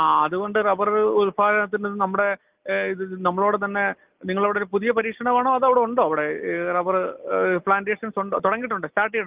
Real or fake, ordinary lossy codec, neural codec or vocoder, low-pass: real; Opus, 24 kbps; none; 3.6 kHz